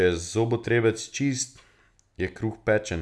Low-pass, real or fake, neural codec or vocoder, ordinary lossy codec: none; real; none; none